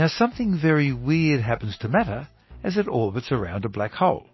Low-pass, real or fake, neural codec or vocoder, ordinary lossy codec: 7.2 kHz; real; none; MP3, 24 kbps